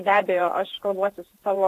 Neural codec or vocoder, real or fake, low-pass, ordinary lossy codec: vocoder, 48 kHz, 128 mel bands, Vocos; fake; 14.4 kHz; AAC, 64 kbps